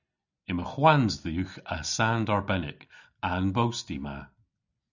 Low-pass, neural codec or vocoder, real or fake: 7.2 kHz; none; real